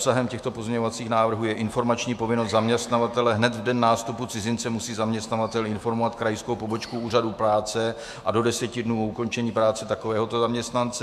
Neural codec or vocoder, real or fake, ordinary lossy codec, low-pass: autoencoder, 48 kHz, 128 numbers a frame, DAC-VAE, trained on Japanese speech; fake; MP3, 96 kbps; 14.4 kHz